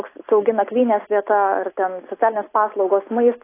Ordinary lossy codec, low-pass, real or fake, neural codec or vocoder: AAC, 24 kbps; 3.6 kHz; real; none